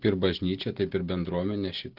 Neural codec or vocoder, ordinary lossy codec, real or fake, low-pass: none; Opus, 16 kbps; real; 5.4 kHz